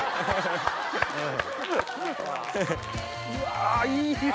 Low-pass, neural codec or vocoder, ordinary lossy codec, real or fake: none; none; none; real